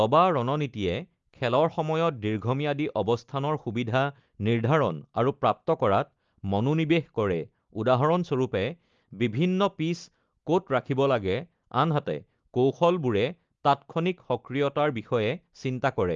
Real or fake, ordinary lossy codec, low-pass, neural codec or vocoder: real; Opus, 32 kbps; 7.2 kHz; none